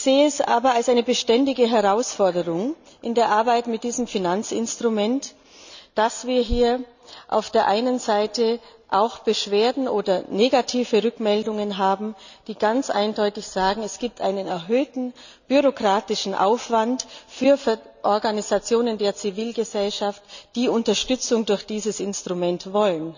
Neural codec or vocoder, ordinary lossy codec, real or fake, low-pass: none; none; real; 7.2 kHz